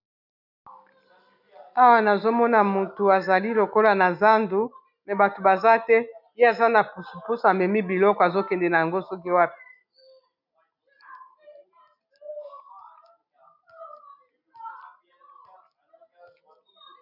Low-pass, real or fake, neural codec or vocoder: 5.4 kHz; real; none